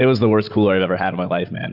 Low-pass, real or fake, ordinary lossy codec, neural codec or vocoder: 5.4 kHz; real; Opus, 64 kbps; none